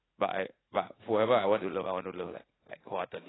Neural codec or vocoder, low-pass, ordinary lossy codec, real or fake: vocoder, 44.1 kHz, 80 mel bands, Vocos; 7.2 kHz; AAC, 16 kbps; fake